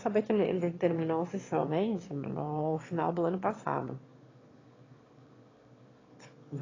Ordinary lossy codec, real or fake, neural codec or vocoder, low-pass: AAC, 32 kbps; fake; autoencoder, 22.05 kHz, a latent of 192 numbers a frame, VITS, trained on one speaker; 7.2 kHz